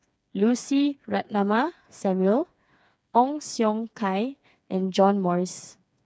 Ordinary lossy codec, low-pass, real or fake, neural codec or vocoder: none; none; fake; codec, 16 kHz, 4 kbps, FreqCodec, smaller model